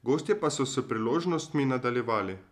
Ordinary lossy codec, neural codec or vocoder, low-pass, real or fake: none; none; 14.4 kHz; real